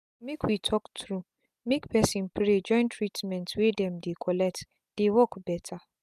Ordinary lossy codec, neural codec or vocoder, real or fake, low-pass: none; none; real; 14.4 kHz